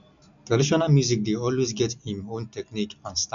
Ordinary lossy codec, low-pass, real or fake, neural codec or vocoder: none; 7.2 kHz; real; none